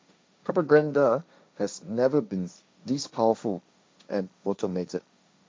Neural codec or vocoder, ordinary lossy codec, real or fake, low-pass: codec, 16 kHz, 1.1 kbps, Voila-Tokenizer; none; fake; none